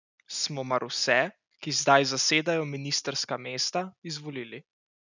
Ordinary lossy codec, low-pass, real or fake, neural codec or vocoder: none; 7.2 kHz; real; none